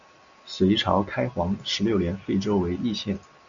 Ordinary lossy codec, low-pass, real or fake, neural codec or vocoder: Opus, 64 kbps; 7.2 kHz; real; none